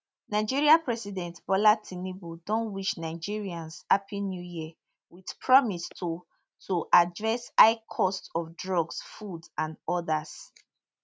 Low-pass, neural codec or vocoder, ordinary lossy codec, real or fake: none; none; none; real